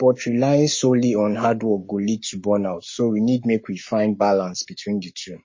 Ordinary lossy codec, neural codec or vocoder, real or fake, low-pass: MP3, 32 kbps; codec, 44.1 kHz, 7.8 kbps, Pupu-Codec; fake; 7.2 kHz